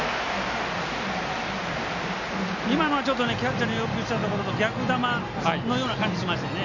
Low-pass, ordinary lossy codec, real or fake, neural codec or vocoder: 7.2 kHz; none; real; none